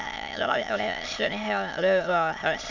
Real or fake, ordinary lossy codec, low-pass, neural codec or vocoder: fake; none; 7.2 kHz; autoencoder, 22.05 kHz, a latent of 192 numbers a frame, VITS, trained on many speakers